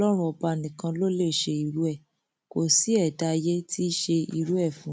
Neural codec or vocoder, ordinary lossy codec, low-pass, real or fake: none; none; none; real